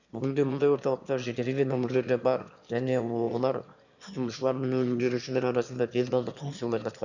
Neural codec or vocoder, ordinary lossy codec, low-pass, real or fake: autoencoder, 22.05 kHz, a latent of 192 numbers a frame, VITS, trained on one speaker; none; 7.2 kHz; fake